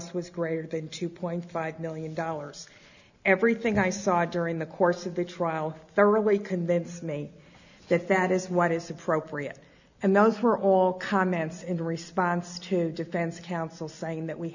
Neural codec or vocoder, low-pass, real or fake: none; 7.2 kHz; real